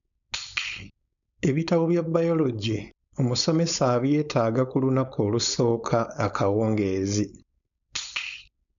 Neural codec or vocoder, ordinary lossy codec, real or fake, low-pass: codec, 16 kHz, 4.8 kbps, FACodec; none; fake; 7.2 kHz